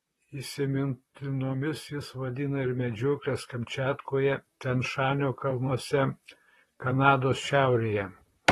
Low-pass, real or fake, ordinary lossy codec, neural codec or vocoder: 19.8 kHz; fake; AAC, 32 kbps; vocoder, 44.1 kHz, 128 mel bands, Pupu-Vocoder